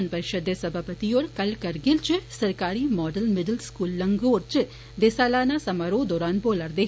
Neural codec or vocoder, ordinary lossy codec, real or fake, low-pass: none; none; real; none